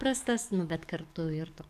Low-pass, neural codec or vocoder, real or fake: 14.4 kHz; codec, 44.1 kHz, 7.8 kbps, DAC; fake